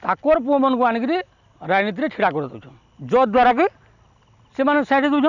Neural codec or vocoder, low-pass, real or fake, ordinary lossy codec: none; 7.2 kHz; real; none